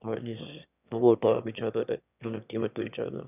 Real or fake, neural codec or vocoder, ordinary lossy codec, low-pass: fake; autoencoder, 22.05 kHz, a latent of 192 numbers a frame, VITS, trained on one speaker; none; 3.6 kHz